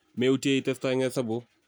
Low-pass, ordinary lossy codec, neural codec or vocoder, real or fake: none; none; none; real